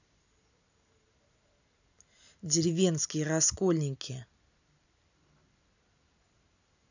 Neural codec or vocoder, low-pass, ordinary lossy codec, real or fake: none; 7.2 kHz; none; real